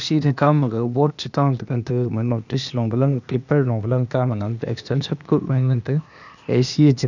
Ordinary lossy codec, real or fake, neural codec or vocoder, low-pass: none; fake; codec, 16 kHz, 0.8 kbps, ZipCodec; 7.2 kHz